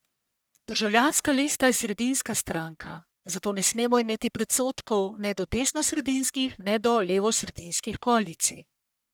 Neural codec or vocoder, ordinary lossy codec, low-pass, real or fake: codec, 44.1 kHz, 1.7 kbps, Pupu-Codec; none; none; fake